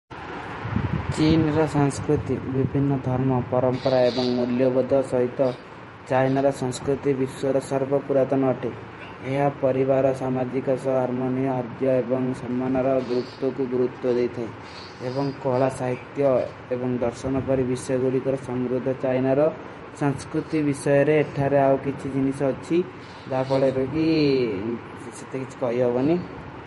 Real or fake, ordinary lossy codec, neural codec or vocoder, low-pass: fake; MP3, 48 kbps; vocoder, 48 kHz, 128 mel bands, Vocos; 19.8 kHz